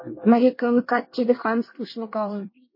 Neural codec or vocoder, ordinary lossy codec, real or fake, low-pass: codec, 24 kHz, 1 kbps, SNAC; MP3, 24 kbps; fake; 5.4 kHz